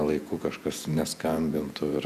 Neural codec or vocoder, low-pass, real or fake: vocoder, 48 kHz, 128 mel bands, Vocos; 14.4 kHz; fake